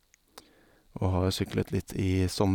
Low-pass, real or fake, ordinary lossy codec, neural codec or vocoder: 19.8 kHz; real; none; none